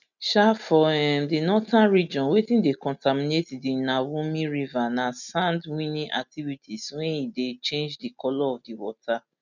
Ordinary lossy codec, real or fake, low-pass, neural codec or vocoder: none; real; 7.2 kHz; none